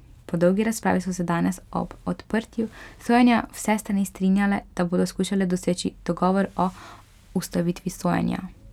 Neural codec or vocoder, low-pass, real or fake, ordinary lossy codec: none; 19.8 kHz; real; none